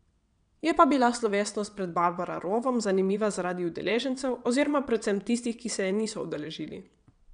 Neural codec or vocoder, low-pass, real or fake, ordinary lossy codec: vocoder, 22.05 kHz, 80 mel bands, WaveNeXt; 9.9 kHz; fake; none